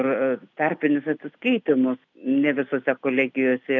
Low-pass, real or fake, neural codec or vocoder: 7.2 kHz; real; none